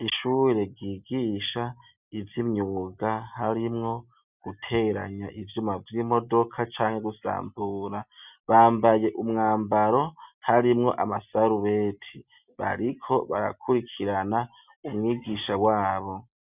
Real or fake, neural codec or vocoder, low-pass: real; none; 3.6 kHz